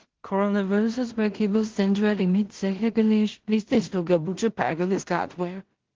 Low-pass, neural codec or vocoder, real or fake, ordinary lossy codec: 7.2 kHz; codec, 16 kHz in and 24 kHz out, 0.4 kbps, LongCat-Audio-Codec, two codebook decoder; fake; Opus, 16 kbps